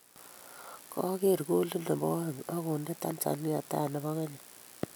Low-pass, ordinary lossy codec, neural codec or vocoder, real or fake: none; none; none; real